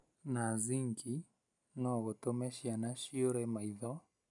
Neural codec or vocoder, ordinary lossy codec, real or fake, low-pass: none; none; real; 10.8 kHz